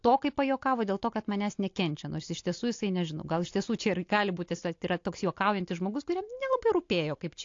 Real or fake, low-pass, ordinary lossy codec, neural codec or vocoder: real; 7.2 kHz; AAC, 48 kbps; none